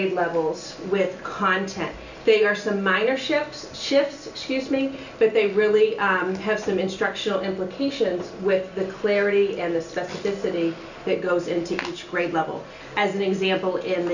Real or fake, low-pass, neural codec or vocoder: real; 7.2 kHz; none